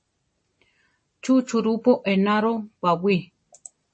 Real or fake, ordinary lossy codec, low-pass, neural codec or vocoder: real; MP3, 32 kbps; 9.9 kHz; none